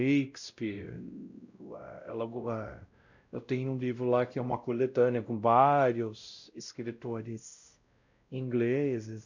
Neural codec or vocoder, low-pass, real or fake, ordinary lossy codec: codec, 16 kHz, 0.5 kbps, X-Codec, WavLM features, trained on Multilingual LibriSpeech; 7.2 kHz; fake; none